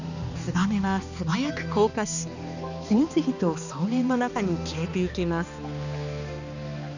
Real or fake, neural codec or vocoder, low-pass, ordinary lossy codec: fake; codec, 16 kHz, 2 kbps, X-Codec, HuBERT features, trained on balanced general audio; 7.2 kHz; none